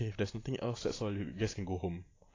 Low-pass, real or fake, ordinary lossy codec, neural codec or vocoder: 7.2 kHz; real; AAC, 32 kbps; none